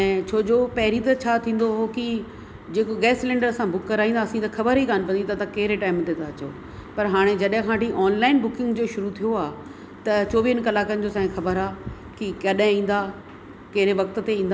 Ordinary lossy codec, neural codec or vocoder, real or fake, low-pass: none; none; real; none